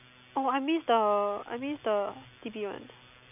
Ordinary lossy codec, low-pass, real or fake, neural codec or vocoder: none; 3.6 kHz; real; none